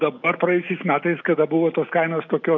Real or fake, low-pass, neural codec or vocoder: real; 7.2 kHz; none